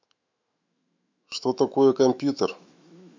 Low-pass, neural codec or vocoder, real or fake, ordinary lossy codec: 7.2 kHz; autoencoder, 48 kHz, 128 numbers a frame, DAC-VAE, trained on Japanese speech; fake; MP3, 64 kbps